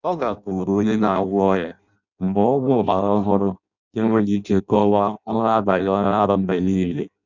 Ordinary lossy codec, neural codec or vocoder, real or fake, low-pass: none; codec, 16 kHz in and 24 kHz out, 0.6 kbps, FireRedTTS-2 codec; fake; 7.2 kHz